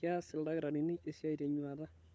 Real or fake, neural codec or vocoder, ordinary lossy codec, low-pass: fake; codec, 16 kHz, 16 kbps, FunCodec, trained on LibriTTS, 50 frames a second; none; none